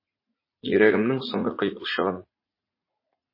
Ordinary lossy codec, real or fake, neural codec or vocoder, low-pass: MP3, 24 kbps; fake; vocoder, 44.1 kHz, 80 mel bands, Vocos; 5.4 kHz